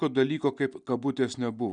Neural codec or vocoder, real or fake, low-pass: none; real; 9.9 kHz